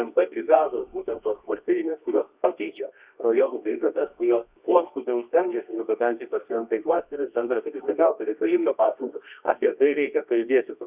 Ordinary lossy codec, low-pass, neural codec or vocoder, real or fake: Opus, 64 kbps; 3.6 kHz; codec, 24 kHz, 0.9 kbps, WavTokenizer, medium music audio release; fake